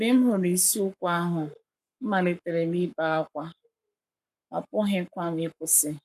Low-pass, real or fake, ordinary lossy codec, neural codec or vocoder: 14.4 kHz; fake; none; codec, 44.1 kHz, 7.8 kbps, Pupu-Codec